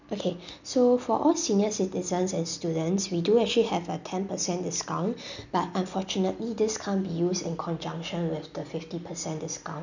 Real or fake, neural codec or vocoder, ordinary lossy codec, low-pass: real; none; none; 7.2 kHz